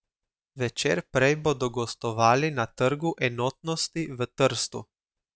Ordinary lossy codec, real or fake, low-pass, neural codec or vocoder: none; real; none; none